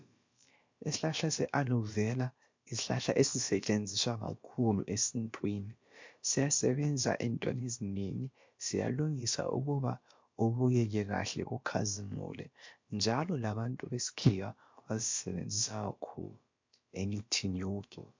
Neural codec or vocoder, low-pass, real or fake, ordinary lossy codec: codec, 16 kHz, about 1 kbps, DyCAST, with the encoder's durations; 7.2 kHz; fake; MP3, 48 kbps